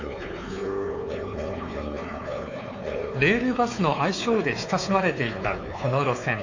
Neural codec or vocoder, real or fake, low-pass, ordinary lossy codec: codec, 16 kHz, 4 kbps, X-Codec, WavLM features, trained on Multilingual LibriSpeech; fake; 7.2 kHz; AAC, 48 kbps